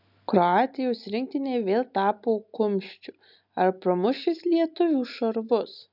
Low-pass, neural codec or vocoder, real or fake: 5.4 kHz; none; real